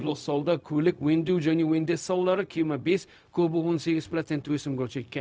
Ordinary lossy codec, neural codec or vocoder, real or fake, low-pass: none; codec, 16 kHz, 0.4 kbps, LongCat-Audio-Codec; fake; none